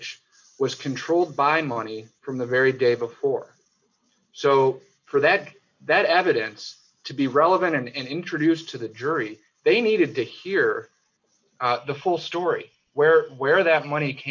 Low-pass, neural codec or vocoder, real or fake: 7.2 kHz; none; real